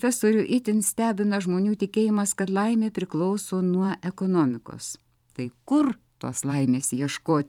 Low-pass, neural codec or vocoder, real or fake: 19.8 kHz; vocoder, 44.1 kHz, 128 mel bands every 512 samples, BigVGAN v2; fake